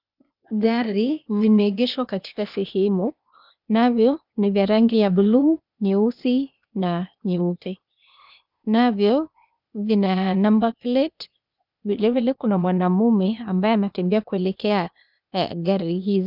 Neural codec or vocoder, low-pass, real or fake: codec, 16 kHz, 0.8 kbps, ZipCodec; 5.4 kHz; fake